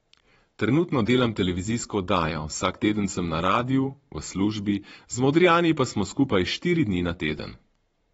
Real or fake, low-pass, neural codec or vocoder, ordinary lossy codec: fake; 9.9 kHz; vocoder, 22.05 kHz, 80 mel bands, Vocos; AAC, 24 kbps